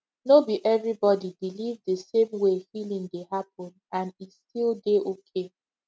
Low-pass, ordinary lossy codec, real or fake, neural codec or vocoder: none; none; real; none